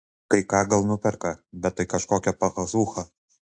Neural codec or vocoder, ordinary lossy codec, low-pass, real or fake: none; AAC, 64 kbps; 9.9 kHz; real